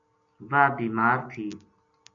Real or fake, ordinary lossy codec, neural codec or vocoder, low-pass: real; MP3, 96 kbps; none; 7.2 kHz